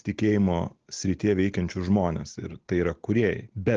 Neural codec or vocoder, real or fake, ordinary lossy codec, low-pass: none; real; Opus, 16 kbps; 7.2 kHz